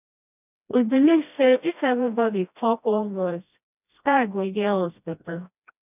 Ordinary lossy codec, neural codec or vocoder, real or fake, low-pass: none; codec, 16 kHz, 1 kbps, FreqCodec, smaller model; fake; 3.6 kHz